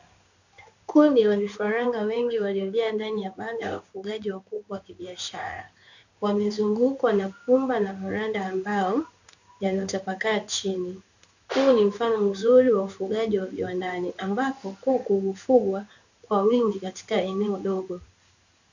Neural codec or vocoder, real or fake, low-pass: codec, 16 kHz in and 24 kHz out, 1 kbps, XY-Tokenizer; fake; 7.2 kHz